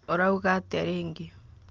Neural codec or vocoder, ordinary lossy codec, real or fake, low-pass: none; Opus, 24 kbps; real; 7.2 kHz